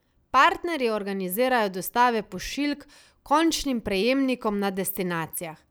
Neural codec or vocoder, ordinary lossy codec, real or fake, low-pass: none; none; real; none